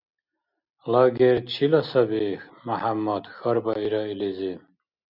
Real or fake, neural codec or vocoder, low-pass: real; none; 5.4 kHz